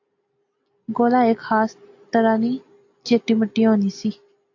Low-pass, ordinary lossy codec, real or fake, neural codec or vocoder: 7.2 kHz; AAC, 48 kbps; real; none